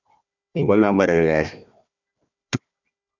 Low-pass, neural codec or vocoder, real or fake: 7.2 kHz; codec, 16 kHz, 1 kbps, FunCodec, trained on Chinese and English, 50 frames a second; fake